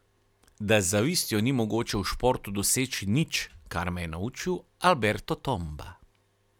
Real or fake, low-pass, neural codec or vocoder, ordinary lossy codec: real; 19.8 kHz; none; none